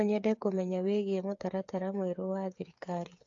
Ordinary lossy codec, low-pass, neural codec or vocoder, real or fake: AAC, 48 kbps; 7.2 kHz; codec, 16 kHz, 8 kbps, FreqCodec, smaller model; fake